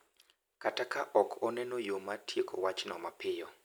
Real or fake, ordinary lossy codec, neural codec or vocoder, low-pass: real; none; none; none